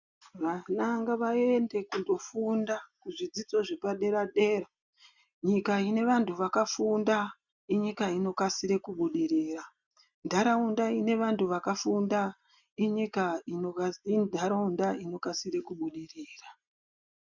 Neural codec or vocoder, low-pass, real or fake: none; 7.2 kHz; real